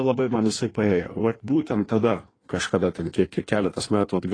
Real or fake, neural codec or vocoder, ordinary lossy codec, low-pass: fake; codec, 16 kHz in and 24 kHz out, 1.1 kbps, FireRedTTS-2 codec; AAC, 32 kbps; 9.9 kHz